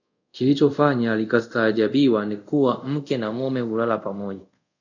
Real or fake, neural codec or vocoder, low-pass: fake; codec, 24 kHz, 0.5 kbps, DualCodec; 7.2 kHz